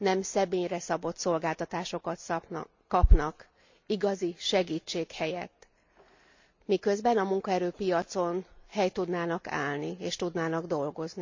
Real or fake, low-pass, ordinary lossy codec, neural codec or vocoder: real; 7.2 kHz; MP3, 64 kbps; none